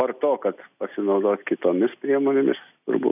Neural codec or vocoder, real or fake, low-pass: none; real; 3.6 kHz